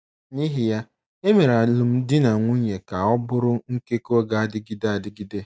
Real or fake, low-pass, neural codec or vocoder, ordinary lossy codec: real; none; none; none